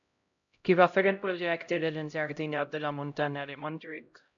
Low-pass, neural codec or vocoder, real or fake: 7.2 kHz; codec, 16 kHz, 0.5 kbps, X-Codec, HuBERT features, trained on LibriSpeech; fake